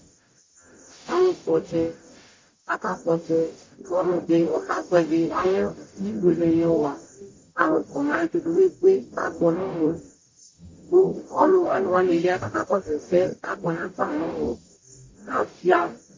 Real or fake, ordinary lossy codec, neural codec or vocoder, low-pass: fake; MP3, 32 kbps; codec, 44.1 kHz, 0.9 kbps, DAC; 7.2 kHz